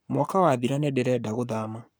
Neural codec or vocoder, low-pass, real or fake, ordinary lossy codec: codec, 44.1 kHz, 7.8 kbps, Pupu-Codec; none; fake; none